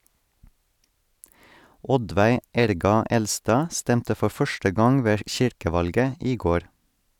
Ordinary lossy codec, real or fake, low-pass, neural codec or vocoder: none; real; 19.8 kHz; none